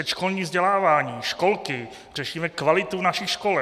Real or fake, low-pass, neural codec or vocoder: real; 14.4 kHz; none